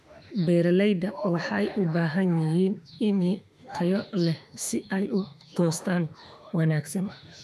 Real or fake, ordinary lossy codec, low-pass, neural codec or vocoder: fake; none; 14.4 kHz; autoencoder, 48 kHz, 32 numbers a frame, DAC-VAE, trained on Japanese speech